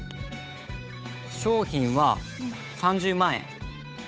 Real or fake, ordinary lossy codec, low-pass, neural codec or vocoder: fake; none; none; codec, 16 kHz, 8 kbps, FunCodec, trained on Chinese and English, 25 frames a second